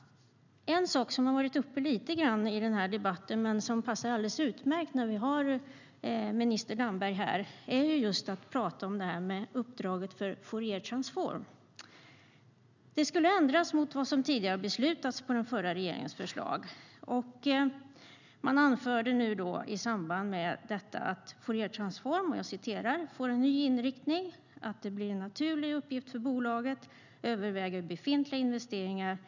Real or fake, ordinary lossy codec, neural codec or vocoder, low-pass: real; none; none; 7.2 kHz